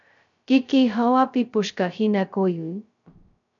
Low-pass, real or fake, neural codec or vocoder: 7.2 kHz; fake; codec, 16 kHz, 0.2 kbps, FocalCodec